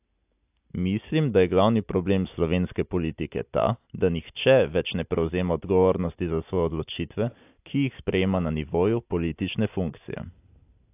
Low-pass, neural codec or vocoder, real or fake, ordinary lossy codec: 3.6 kHz; none; real; AAC, 32 kbps